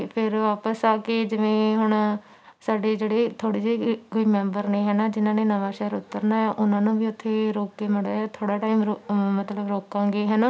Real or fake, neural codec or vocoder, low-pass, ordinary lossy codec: real; none; none; none